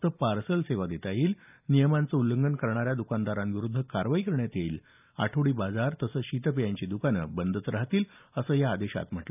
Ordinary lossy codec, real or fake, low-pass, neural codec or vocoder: none; real; 3.6 kHz; none